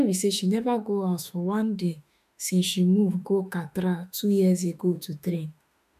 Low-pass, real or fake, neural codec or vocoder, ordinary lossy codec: 14.4 kHz; fake; autoencoder, 48 kHz, 32 numbers a frame, DAC-VAE, trained on Japanese speech; none